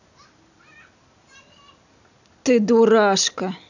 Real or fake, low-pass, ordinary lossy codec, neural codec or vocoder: real; 7.2 kHz; none; none